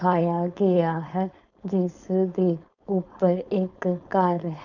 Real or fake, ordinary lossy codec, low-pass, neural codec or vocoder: fake; none; 7.2 kHz; codec, 16 kHz, 4.8 kbps, FACodec